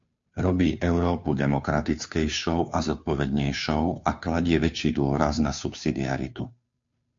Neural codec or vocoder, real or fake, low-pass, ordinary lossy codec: codec, 16 kHz, 2 kbps, FunCodec, trained on Chinese and English, 25 frames a second; fake; 7.2 kHz; MP3, 48 kbps